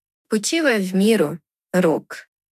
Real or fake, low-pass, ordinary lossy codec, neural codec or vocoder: fake; 14.4 kHz; none; autoencoder, 48 kHz, 32 numbers a frame, DAC-VAE, trained on Japanese speech